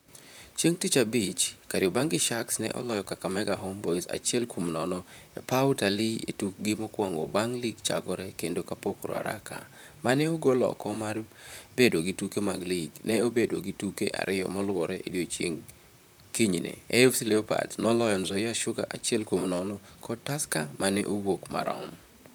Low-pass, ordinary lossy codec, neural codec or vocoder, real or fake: none; none; vocoder, 44.1 kHz, 128 mel bands, Pupu-Vocoder; fake